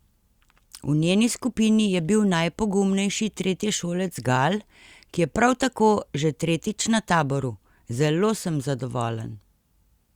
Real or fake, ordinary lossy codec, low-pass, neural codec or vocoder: real; Opus, 64 kbps; 19.8 kHz; none